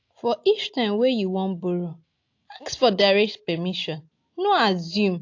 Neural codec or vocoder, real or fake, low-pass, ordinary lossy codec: none; real; 7.2 kHz; AAC, 48 kbps